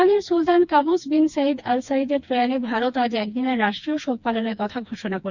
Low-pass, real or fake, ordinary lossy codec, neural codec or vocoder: 7.2 kHz; fake; none; codec, 16 kHz, 2 kbps, FreqCodec, smaller model